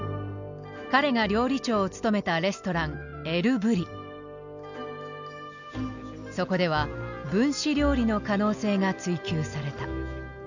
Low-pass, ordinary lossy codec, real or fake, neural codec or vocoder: 7.2 kHz; none; real; none